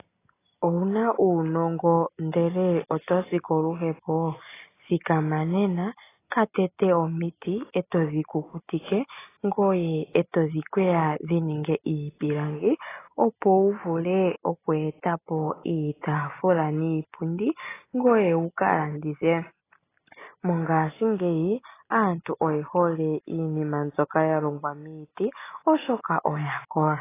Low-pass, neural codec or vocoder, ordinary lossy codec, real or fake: 3.6 kHz; none; AAC, 16 kbps; real